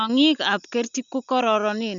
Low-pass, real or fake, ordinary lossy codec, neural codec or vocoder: 7.2 kHz; real; none; none